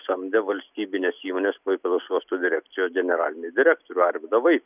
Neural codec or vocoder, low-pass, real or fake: none; 3.6 kHz; real